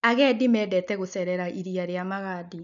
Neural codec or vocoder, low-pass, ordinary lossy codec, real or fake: none; 7.2 kHz; none; real